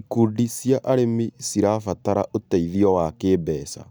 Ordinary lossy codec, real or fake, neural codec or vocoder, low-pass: none; real; none; none